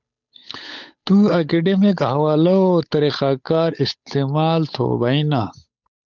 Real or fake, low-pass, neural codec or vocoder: fake; 7.2 kHz; codec, 16 kHz, 8 kbps, FunCodec, trained on Chinese and English, 25 frames a second